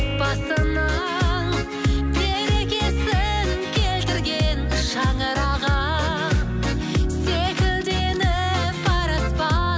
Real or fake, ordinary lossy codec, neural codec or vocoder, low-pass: real; none; none; none